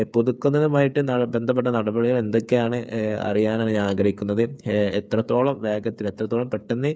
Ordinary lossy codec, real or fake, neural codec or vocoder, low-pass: none; fake; codec, 16 kHz, 8 kbps, FreqCodec, smaller model; none